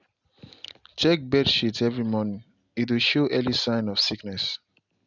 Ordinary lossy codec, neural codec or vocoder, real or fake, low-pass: none; none; real; 7.2 kHz